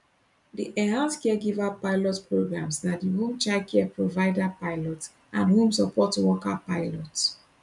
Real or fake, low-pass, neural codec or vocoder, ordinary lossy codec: real; 10.8 kHz; none; none